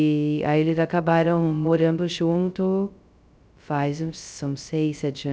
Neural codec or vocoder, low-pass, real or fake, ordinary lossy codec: codec, 16 kHz, 0.2 kbps, FocalCodec; none; fake; none